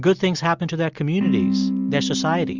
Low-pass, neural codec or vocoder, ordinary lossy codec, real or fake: 7.2 kHz; none; Opus, 64 kbps; real